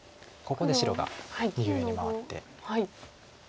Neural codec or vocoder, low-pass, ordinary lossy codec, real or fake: none; none; none; real